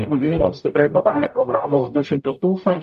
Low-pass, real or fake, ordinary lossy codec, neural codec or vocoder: 14.4 kHz; fake; AAC, 96 kbps; codec, 44.1 kHz, 0.9 kbps, DAC